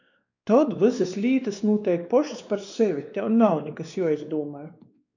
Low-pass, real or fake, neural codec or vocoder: 7.2 kHz; fake; codec, 16 kHz, 2 kbps, X-Codec, WavLM features, trained on Multilingual LibriSpeech